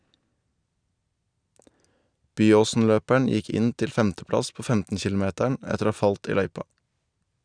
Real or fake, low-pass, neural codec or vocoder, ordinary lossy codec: real; 9.9 kHz; none; none